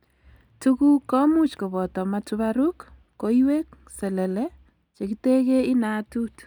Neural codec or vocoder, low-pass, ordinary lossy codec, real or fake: none; 19.8 kHz; none; real